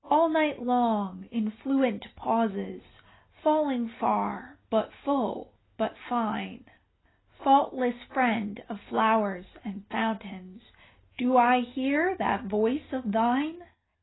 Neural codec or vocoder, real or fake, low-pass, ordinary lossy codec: none; real; 7.2 kHz; AAC, 16 kbps